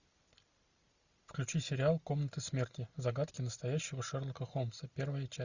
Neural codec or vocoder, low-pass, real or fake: none; 7.2 kHz; real